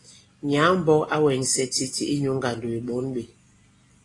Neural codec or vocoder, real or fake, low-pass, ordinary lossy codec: none; real; 10.8 kHz; AAC, 32 kbps